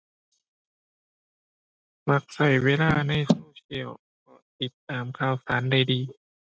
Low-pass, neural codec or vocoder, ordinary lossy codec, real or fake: none; none; none; real